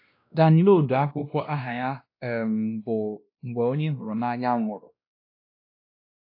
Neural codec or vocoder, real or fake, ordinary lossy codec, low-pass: codec, 16 kHz, 1 kbps, X-Codec, WavLM features, trained on Multilingual LibriSpeech; fake; AAC, 32 kbps; 5.4 kHz